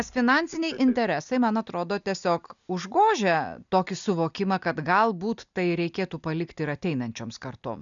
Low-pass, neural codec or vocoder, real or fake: 7.2 kHz; none; real